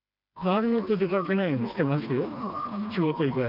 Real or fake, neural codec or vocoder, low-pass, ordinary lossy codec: fake; codec, 16 kHz, 2 kbps, FreqCodec, smaller model; 5.4 kHz; none